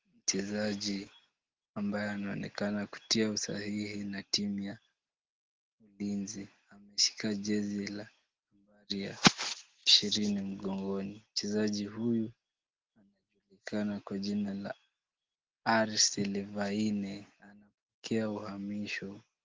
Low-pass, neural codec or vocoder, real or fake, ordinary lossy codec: 7.2 kHz; none; real; Opus, 16 kbps